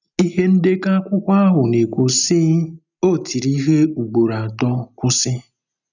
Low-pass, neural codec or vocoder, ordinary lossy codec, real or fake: 7.2 kHz; none; none; real